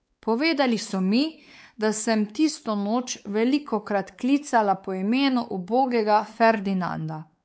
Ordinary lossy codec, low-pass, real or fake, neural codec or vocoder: none; none; fake; codec, 16 kHz, 4 kbps, X-Codec, WavLM features, trained on Multilingual LibriSpeech